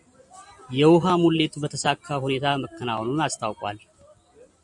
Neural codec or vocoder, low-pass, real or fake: none; 10.8 kHz; real